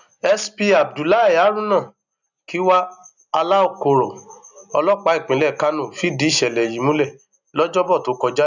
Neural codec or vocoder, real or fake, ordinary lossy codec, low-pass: none; real; none; 7.2 kHz